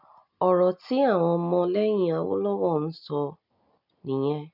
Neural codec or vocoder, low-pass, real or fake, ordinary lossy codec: none; 5.4 kHz; real; none